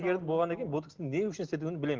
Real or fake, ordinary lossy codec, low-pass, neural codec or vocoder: real; Opus, 16 kbps; 7.2 kHz; none